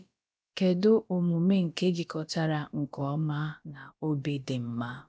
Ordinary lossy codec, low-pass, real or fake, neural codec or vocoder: none; none; fake; codec, 16 kHz, about 1 kbps, DyCAST, with the encoder's durations